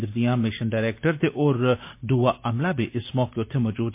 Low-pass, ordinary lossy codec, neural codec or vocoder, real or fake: 3.6 kHz; MP3, 24 kbps; none; real